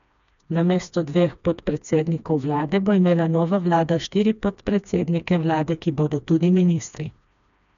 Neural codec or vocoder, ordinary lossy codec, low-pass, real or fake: codec, 16 kHz, 2 kbps, FreqCodec, smaller model; none; 7.2 kHz; fake